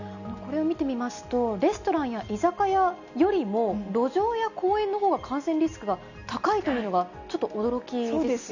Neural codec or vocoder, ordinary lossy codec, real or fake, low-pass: none; none; real; 7.2 kHz